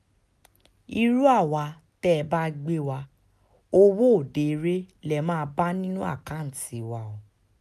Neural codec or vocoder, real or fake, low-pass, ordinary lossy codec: none; real; 14.4 kHz; none